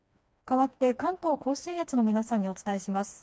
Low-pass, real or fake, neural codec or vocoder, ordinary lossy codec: none; fake; codec, 16 kHz, 2 kbps, FreqCodec, smaller model; none